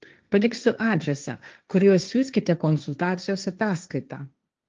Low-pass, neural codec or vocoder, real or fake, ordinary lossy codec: 7.2 kHz; codec, 16 kHz, 1.1 kbps, Voila-Tokenizer; fake; Opus, 24 kbps